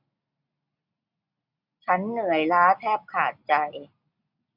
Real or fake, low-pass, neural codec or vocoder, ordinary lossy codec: real; 5.4 kHz; none; none